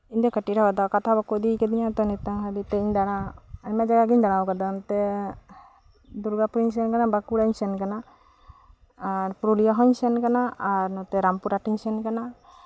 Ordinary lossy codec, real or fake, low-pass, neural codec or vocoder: none; real; none; none